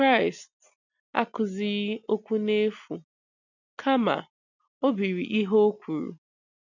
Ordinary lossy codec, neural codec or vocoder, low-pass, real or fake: none; none; 7.2 kHz; real